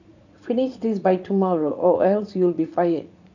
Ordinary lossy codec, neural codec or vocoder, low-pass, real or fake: none; vocoder, 44.1 kHz, 80 mel bands, Vocos; 7.2 kHz; fake